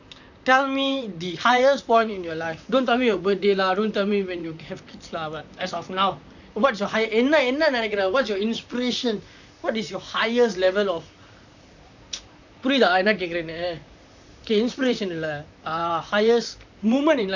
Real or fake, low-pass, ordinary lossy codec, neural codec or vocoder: fake; 7.2 kHz; none; vocoder, 44.1 kHz, 128 mel bands, Pupu-Vocoder